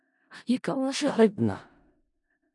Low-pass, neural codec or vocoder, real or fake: 10.8 kHz; codec, 16 kHz in and 24 kHz out, 0.4 kbps, LongCat-Audio-Codec, four codebook decoder; fake